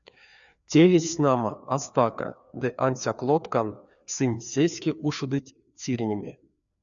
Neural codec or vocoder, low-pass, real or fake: codec, 16 kHz, 2 kbps, FreqCodec, larger model; 7.2 kHz; fake